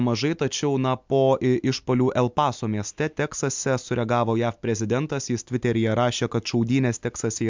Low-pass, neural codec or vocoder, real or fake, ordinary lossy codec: 7.2 kHz; none; real; MP3, 64 kbps